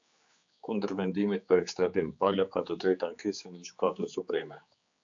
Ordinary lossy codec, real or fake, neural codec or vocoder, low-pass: AAC, 64 kbps; fake; codec, 16 kHz, 4 kbps, X-Codec, HuBERT features, trained on general audio; 7.2 kHz